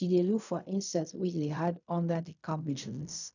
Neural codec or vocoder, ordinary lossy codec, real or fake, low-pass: codec, 16 kHz in and 24 kHz out, 0.4 kbps, LongCat-Audio-Codec, fine tuned four codebook decoder; none; fake; 7.2 kHz